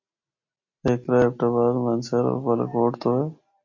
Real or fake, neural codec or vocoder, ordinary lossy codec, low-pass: real; none; MP3, 32 kbps; 7.2 kHz